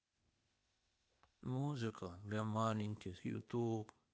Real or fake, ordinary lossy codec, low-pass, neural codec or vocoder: fake; none; none; codec, 16 kHz, 0.8 kbps, ZipCodec